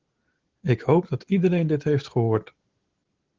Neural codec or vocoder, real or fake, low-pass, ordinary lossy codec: none; real; 7.2 kHz; Opus, 16 kbps